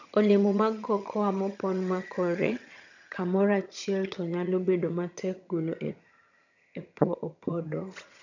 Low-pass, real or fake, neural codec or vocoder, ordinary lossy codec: 7.2 kHz; fake; vocoder, 22.05 kHz, 80 mel bands, HiFi-GAN; none